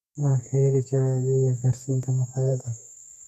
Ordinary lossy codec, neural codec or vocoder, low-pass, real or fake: none; codec, 32 kHz, 1.9 kbps, SNAC; 14.4 kHz; fake